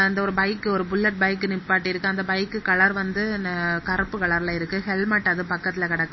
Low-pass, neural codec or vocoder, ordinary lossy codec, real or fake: 7.2 kHz; none; MP3, 24 kbps; real